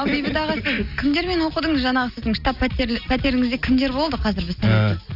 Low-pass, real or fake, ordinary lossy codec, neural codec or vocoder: 5.4 kHz; real; AAC, 48 kbps; none